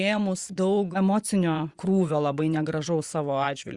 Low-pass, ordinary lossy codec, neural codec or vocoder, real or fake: 10.8 kHz; Opus, 64 kbps; vocoder, 24 kHz, 100 mel bands, Vocos; fake